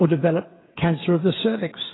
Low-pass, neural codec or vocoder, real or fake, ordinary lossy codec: 7.2 kHz; codec, 16 kHz, 8 kbps, FreqCodec, smaller model; fake; AAC, 16 kbps